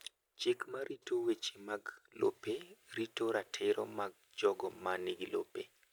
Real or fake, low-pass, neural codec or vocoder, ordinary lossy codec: real; none; none; none